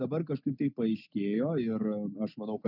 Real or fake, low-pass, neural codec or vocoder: real; 5.4 kHz; none